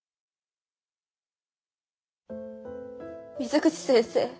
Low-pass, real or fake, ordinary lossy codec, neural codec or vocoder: none; real; none; none